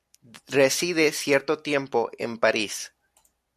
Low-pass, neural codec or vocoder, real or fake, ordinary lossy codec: 14.4 kHz; none; real; MP3, 64 kbps